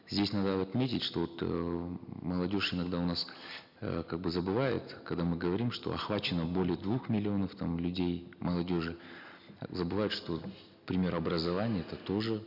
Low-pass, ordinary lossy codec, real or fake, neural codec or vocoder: 5.4 kHz; none; real; none